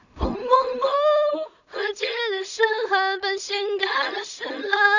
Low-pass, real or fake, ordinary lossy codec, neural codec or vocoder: 7.2 kHz; fake; none; codec, 16 kHz in and 24 kHz out, 0.4 kbps, LongCat-Audio-Codec, two codebook decoder